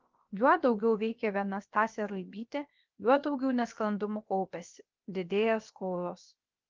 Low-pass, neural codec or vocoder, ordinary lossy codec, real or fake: 7.2 kHz; codec, 16 kHz, about 1 kbps, DyCAST, with the encoder's durations; Opus, 32 kbps; fake